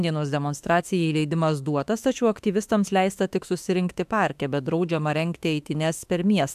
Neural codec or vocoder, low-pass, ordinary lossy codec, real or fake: autoencoder, 48 kHz, 32 numbers a frame, DAC-VAE, trained on Japanese speech; 14.4 kHz; AAC, 96 kbps; fake